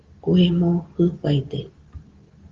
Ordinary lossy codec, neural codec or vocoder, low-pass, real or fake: Opus, 16 kbps; none; 7.2 kHz; real